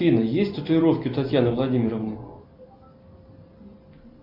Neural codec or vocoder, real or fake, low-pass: none; real; 5.4 kHz